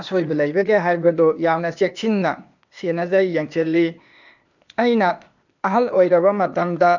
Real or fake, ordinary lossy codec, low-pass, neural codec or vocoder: fake; none; 7.2 kHz; codec, 16 kHz, 0.8 kbps, ZipCodec